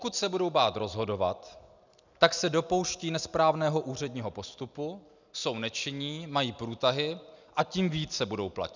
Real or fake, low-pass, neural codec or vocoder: real; 7.2 kHz; none